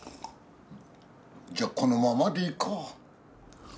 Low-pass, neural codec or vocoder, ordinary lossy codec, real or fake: none; none; none; real